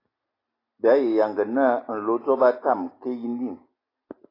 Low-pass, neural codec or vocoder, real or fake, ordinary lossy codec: 5.4 kHz; none; real; AAC, 24 kbps